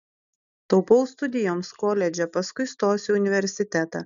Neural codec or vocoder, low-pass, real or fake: none; 7.2 kHz; real